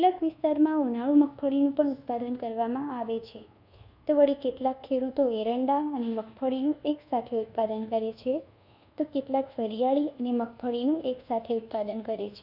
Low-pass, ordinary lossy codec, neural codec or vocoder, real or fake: 5.4 kHz; none; codec, 24 kHz, 1.2 kbps, DualCodec; fake